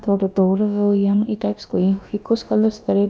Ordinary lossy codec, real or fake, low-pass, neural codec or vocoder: none; fake; none; codec, 16 kHz, about 1 kbps, DyCAST, with the encoder's durations